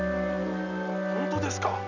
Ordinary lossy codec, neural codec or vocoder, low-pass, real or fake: none; none; 7.2 kHz; real